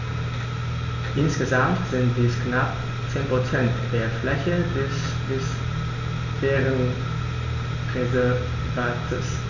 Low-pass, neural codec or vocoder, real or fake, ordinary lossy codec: 7.2 kHz; none; real; none